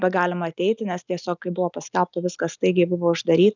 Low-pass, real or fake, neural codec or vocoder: 7.2 kHz; real; none